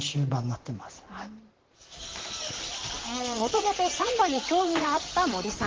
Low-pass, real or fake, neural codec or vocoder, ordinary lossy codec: 7.2 kHz; fake; vocoder, 44.1 kHz, 128 mel bands, Pupu-Vocoder; Opus, 16 kbps